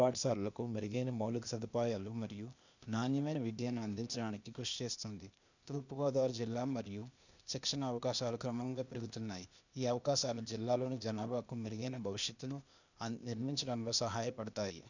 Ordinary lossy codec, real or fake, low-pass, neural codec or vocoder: none; fake; 7.2 kHz; codec, 16 kHz, 0.8 kbps, ZipCodec